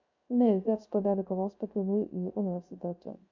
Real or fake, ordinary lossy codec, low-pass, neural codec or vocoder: fake; MP3, 48 kbps; 7.2 kHz; codec, 16 kHz, 0.3 kbps, FocalCodec